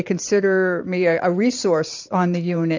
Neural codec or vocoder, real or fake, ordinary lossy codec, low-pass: vocoder, 44.1 kHz, 128 mel bands every 512 samples, BigVGAN v2; fake; MP3, 64 kbps; 7.2 kHz